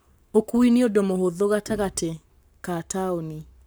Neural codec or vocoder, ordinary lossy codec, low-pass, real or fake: codec, 44.1 kHz, 7.8 kbps, Pupu-Codec; none; none; fake